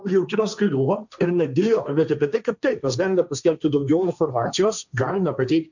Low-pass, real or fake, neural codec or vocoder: 7.2 kHz; fake; codec, 16 kHz, 1.1 kbps, Voila-Tokenizer